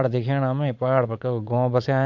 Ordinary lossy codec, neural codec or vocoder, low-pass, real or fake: none; vocoder, 44.1 kHz, 80 mel bands, Vocos; 7.2 kHz; fake